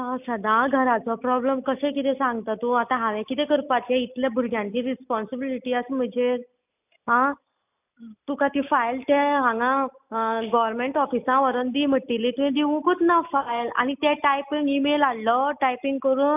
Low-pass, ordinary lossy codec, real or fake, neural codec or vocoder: 3.6 kHz; none; real; none